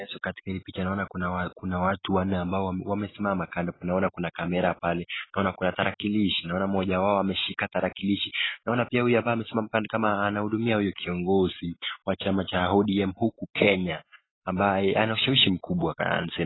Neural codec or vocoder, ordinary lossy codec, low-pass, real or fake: none; AAC, 16 kbps; 7.2 kHz; real